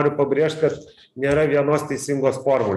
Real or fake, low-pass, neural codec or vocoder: real; 14.4 kHz; none